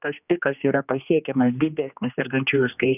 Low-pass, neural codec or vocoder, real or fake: 3.6 kHz; codec, 16 kHz, 2 kbps, X-Codec, HuBERT features, trained on general audio; fake